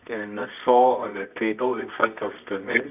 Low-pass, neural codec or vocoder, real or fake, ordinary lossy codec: 3.6 kHz; codec, 24 kHz, 0.9 kbps, WavTokenizer, medium music audio release; fake; none